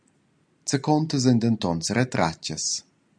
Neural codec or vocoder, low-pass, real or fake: none; 9.9 kHz; real